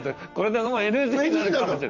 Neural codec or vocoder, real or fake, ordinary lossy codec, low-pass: vocoder, 44.1 kHz, 128 mel bands, Pupu-Vocoder; fake; none; 7.2 kHz